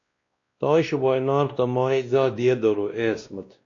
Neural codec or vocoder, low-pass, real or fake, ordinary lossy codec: codec, 16 kHz, 1 kbps, X-Codec, WavLM features, trained on Multilingual LibriSpeech; 7.2 kHz; fake; AAC, 48 kbps